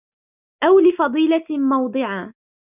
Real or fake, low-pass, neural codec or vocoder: real; 3.6 kHz; none